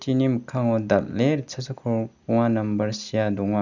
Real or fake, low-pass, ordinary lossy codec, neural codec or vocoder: real; 7.2 kHz; none; none